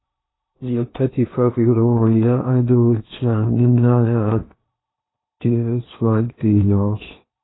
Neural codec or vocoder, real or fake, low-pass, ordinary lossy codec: codec, 16 kHz in and 24 kHz out, 0.8 kbps, FocalCodec, streaming, 65536 codes; fake; 7.2 kHz; AAC, 16 kbps